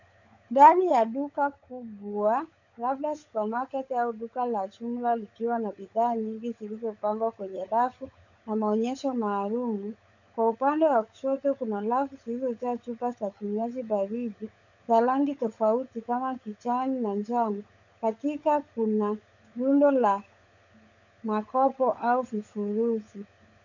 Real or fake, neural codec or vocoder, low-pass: fake; codec, 16 kHz, 16 kbps, FunCodec, trained on LibriTTS, 50 frames a second; 7.2 kHz